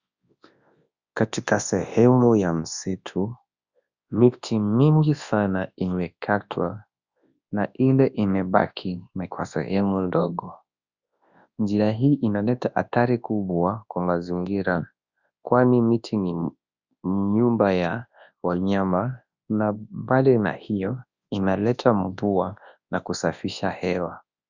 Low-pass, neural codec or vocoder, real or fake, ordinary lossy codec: 7.2 kHz; codec, 24 kHz, 0.9 kbps, WavTokenizer, large speech release; fake; Opus, 64 kbps